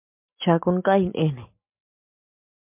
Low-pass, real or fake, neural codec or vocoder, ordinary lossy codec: 3.6 kHz; real; none; MP3, 32 kbps